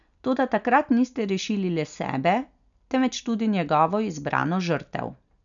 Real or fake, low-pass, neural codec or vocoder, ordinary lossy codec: real; 7.2 kHz; none; none